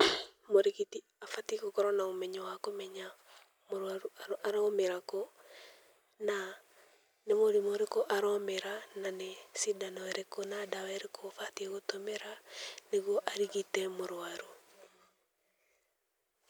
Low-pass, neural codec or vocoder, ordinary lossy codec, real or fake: none; none; none; real